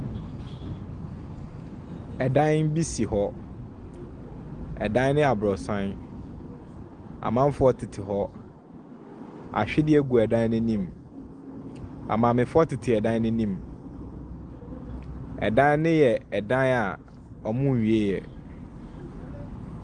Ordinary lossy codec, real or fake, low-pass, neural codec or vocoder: Opus, 24 kbps; real; 9.9 kHz; none